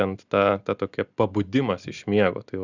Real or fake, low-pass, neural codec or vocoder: real; 7.2 kHz; none